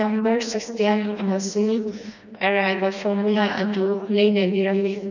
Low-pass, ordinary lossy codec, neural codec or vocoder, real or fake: 7.2 kHz; none; codec, 16 kHz, 1 kbps, FreqCodec, smaller model; fake